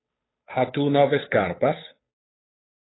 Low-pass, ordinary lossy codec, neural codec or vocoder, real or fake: 7.2 kHz; AAC, 16 kbps; codec, 16 kHz, 8 kbps, FunCodec, trained on Chinese and English, 25 frames a second; fake